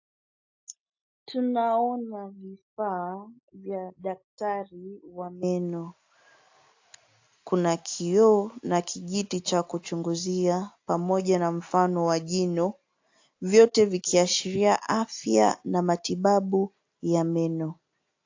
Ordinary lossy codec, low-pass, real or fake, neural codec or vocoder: AAC, 48 kbps; 7.2 kHz; real; none